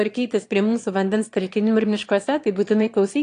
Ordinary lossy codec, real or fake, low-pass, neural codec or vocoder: AAC, 48 kbps; fake; 9.9 kHz; autoencoder, 22.05 kHz, a latent of 192 numbers a frame, VITS, trained on one speaker